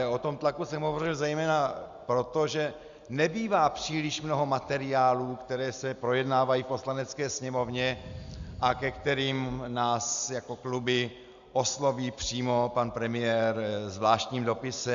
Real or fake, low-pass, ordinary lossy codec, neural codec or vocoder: real; 7.2 kHz; Opus, 64 kbps; none